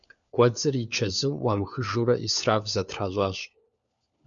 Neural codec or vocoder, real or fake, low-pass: codec, 16 kHz, 4 kbps, FunCodec, trained on LibriTTS, 50 frames a second; fake; 7.2 kHz